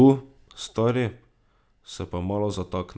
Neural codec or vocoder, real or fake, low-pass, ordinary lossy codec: none; real; none; none